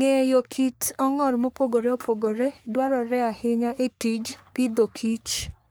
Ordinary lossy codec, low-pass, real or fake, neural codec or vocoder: none; none; fake; codec, 44.1 kHz, 3.4 kbps, Pupu-Codec